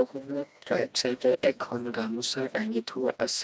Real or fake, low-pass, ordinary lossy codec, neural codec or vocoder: fake; none; none; codec, 16 kHz, 1 kbps, FreqCodec, smaller model